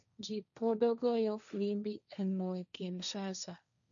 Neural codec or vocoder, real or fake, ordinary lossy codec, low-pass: codec, 16 kHz, 1.1 kbps, Voila-Tokenizer; fake; none; 7.2 kHz